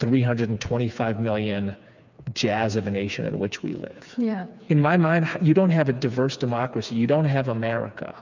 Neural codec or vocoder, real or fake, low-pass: codec, 16 kHz, 4 kbps, FreqCodec, smaller model; fake; 7.2 kHz